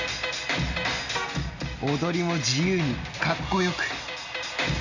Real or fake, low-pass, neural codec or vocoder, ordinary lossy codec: real; 7.2 kHz; none; none